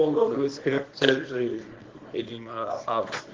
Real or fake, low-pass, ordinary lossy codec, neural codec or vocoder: fake; 7.2 kHz; Opus, 16 kbps; codec, 24 kHz, 0.9 kbps, WavTokenizer, small release